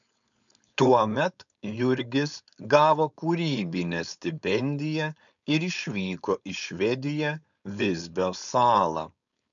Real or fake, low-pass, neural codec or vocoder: fake; 7.2 kHz; codec, 16 kHz, 4.8 kbps, FACodec